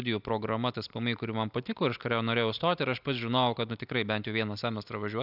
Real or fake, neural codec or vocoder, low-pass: real; none; 5.4 kHz